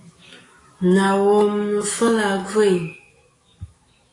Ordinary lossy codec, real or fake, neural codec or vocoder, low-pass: AAC, 32 kbps; fake; autoencoder, 48 kHz, 128 numbers a frame, DAC-VAE, trained on Japanese speech; 10.8 kHz